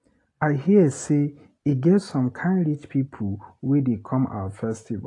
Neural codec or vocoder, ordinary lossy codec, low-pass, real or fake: none; AAC, 48 kbps; 10.8 kHz; real